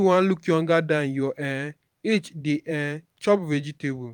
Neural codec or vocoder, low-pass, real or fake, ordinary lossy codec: vocoder, 44.1 kHz, 128 mel bands every 512 samples, BigVGAN v2; 19.8 kHz; fake; none